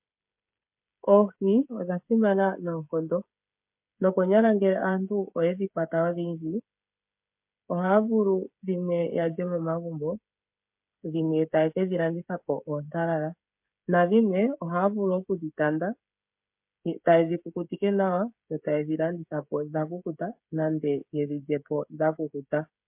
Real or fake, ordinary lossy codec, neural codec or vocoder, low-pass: fake; MP3, 32 kbps; codec, 16 kHz, 16 kbps, FreqCodec, smaller model; 3.6 kHz